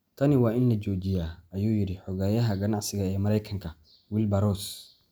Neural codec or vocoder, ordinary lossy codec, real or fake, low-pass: none; none; real; none